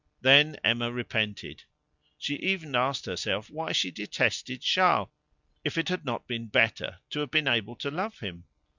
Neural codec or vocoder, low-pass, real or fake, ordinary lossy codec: none; 7.2 kHz; real; Opus, 64 kbps